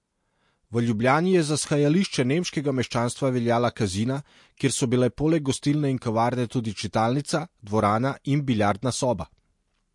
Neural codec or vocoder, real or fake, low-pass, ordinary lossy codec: none; real; 10.8 kHz; MP3, 48 kbps